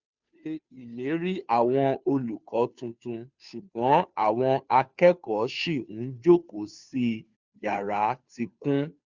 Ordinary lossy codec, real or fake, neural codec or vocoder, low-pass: Opus, 64 kbps; fake; codec, 16 kHz, 2 kbps, FunCodec, trained on Chinese and English, 25 frames a second; 7.2 kHz